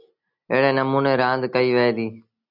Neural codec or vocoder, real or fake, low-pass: none; real; 5.4 kHz